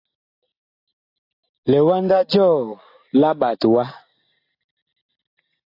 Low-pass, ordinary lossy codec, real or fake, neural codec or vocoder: 5.4 kHz; AAC, 32 kbps; real; none